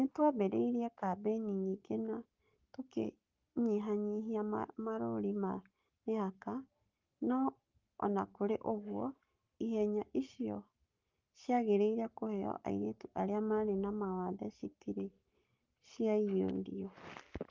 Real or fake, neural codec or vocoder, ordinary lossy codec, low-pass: fake; codec, 44.1 kHz, 7.8 kbps, Pupu-Codec; Opus, 32 kbps; 7.2 kHz